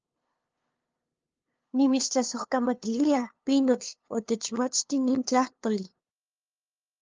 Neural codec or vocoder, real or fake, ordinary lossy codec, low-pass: codec, 16 kHz, 2 kbps, FunCodec, trained on LibriTTS, 25 frames a second; fake; Opus, 24 kbps; 7.2 kHz